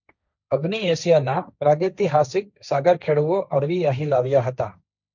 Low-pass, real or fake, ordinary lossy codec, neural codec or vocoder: 7.2 kHz; fake; none; codec, 16 kHz, 1.1 kbps, Voila-Tokenizer